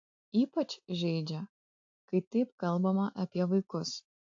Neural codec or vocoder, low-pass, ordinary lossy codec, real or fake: none; 7.2 kHz; AAC, 48 kbps; real